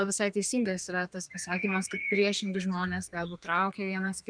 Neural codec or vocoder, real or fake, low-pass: codec, 32 kHz, 1.9 kbps, SNAC; fake; 9.9 kHz